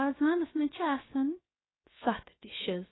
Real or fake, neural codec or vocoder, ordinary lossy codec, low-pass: fake; codec, 16 kHz, 0.7 kbps, FocalCodec; AAC, 16 kbps; 7.2 kHz